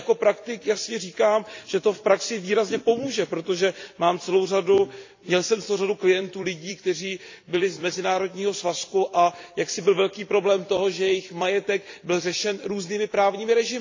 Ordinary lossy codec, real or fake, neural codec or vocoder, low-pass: AAC, 48 kbps; fake; vocoder, 44.1 kHz, 128 mel bands every 256 samples, BigVGAN v2; 7.2 kHz